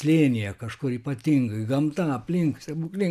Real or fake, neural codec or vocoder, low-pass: real; none; 14.4 kHz